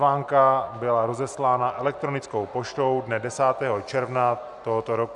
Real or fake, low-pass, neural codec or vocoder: real; 10.8 kHz; none